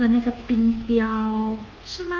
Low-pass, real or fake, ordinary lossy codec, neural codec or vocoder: 7.2 kHz; fake; Opus, 32 kbps; codec, 24 kHz, 1.2 kbps, DualCodec